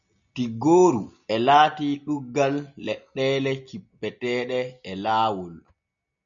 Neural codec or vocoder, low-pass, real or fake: none; 7.2 kHz; real